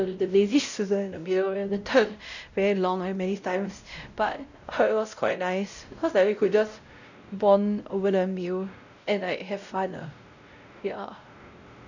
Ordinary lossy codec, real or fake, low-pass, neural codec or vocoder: none; fake; 7.2 kHz; codec, 16 kHz, 0.5 kbps, X-Codec, WavLM features, trained on Multilingual LibriSpeech